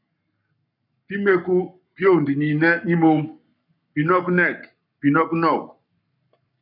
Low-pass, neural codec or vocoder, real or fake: 5.4 kHz; codec, 44.1 kHz, 7.8 kbps, Pupu-Codec; fake